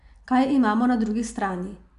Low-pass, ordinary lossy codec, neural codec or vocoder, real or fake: 10.8 kHz; MP3, 96 kbps; none; real